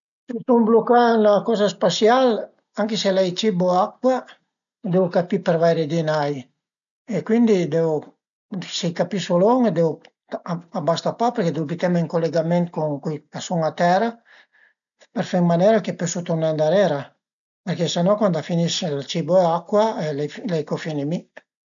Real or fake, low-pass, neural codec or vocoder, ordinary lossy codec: real; 7.2 kHz; none; none